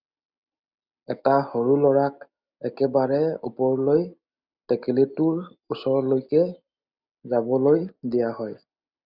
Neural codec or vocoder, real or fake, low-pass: none; real; 5.4 kHz